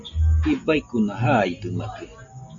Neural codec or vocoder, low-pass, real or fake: none; 7.2 kHz; real